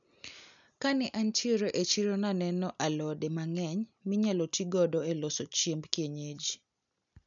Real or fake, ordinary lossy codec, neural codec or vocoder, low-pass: real; none; none; 7.2 kHz